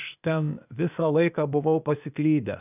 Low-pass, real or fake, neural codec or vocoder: 3.6 kHz; fake; codec, 16 kHz, 0.8 kbps, ZipCodec